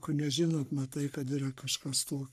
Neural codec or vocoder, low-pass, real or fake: codec, 44.1 kHz, 3.4 kbps, Pupu-Codec; 14.4 kHz; fake